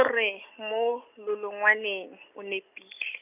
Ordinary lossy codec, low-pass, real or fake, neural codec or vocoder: none; 3.6 kHz; real; none